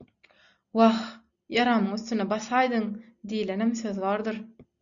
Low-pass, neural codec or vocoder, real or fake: 7.2 kHz; none; real